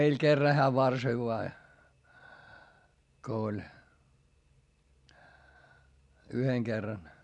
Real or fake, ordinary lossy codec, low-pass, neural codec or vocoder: real; none; 10.8 kHz; none